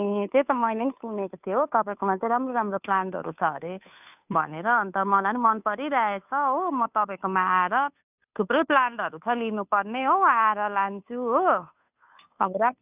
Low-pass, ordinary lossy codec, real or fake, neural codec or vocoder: 3.6 kHz; none; fake; codec, 16 kHz, 2 kbps, FunCodec, trained on Chinese and English, 25 frames a second